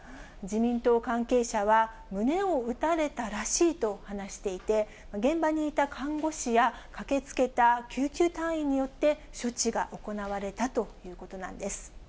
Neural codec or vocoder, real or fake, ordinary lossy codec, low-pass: none; real; none; none